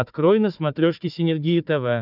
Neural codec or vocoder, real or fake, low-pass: codec, 16 kHz, 4 kbps, FunCodec, trained on Chinese and English, 50 frames a second; fake; 5.4 kHz